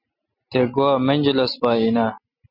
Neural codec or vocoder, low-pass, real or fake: none; 5.4 kHz; real